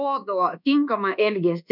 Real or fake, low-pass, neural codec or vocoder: fake; 5.4 kHz; codec, 24 kHz, 1.2 kbps, DualCodec